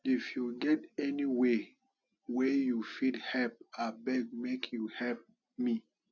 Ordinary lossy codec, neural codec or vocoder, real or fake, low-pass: none; none; real; 7.2 kHz